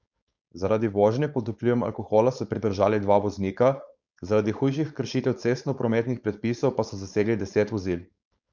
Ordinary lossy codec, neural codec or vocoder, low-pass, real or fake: none; codec, 16 kHz, 4.8 kbps, FACodec; 7.2 kHz; fake